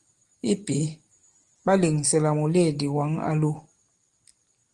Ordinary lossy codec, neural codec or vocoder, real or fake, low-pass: Opus, 24 kbps; none; real; 10.8 kHz